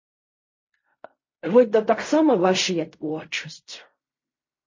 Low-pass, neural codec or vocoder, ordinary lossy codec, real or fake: 7.2 kHz; codec, 16 kHz in and 24 kHz out, 0.4 kbps, LongCat-Audio-Codec, fine tuned four codebook decoder; MP3, 32 kbps; fake